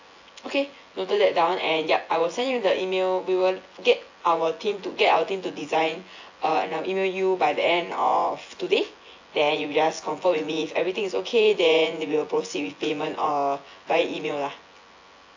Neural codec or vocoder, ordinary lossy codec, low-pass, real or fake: vocoder, 24 kHz, 100 mel bands, Vocos; AAC, 48 kbps; 7.2 kHz; fake